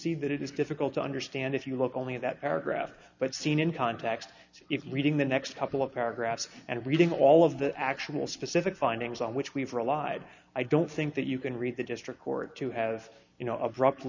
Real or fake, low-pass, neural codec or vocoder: real; 7.2 kHz; none